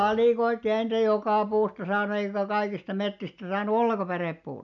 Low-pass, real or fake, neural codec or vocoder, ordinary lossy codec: 7.2 kHz; real; none; none